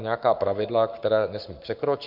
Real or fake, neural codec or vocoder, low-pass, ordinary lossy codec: fake; codec, 44.1 kHz, 7.8 kbps, Pupu-Codec; 5.4 kHz; MP3, 48 kbps